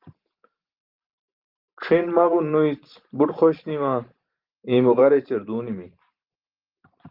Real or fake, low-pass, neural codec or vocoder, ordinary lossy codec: fake; 5.4 kHz; vocoder, 44.1 kHz, 128 mel bands every 512 samples, BigVGAN v2; Opus, 24 kbps